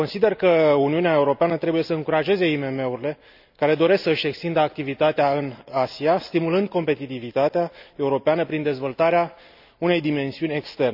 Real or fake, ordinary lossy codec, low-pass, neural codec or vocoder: real; none; 5.4 kHz; none